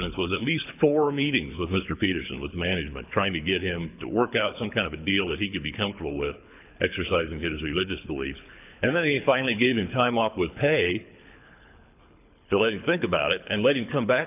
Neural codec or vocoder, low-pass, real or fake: codec, 24 kHz, 6 kbps, HILCodec; 3.6 kHz; fake